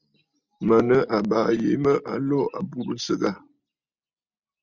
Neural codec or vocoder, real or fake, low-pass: none; real; 7.2 kHz